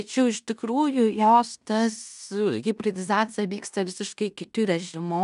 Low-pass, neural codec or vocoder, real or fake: 10.8 kHz; codec, 16 kHz in and 24 kHz out, 0.9 kbps, LongCat-Audio-Codec, fine tuned four codebook decoder; fake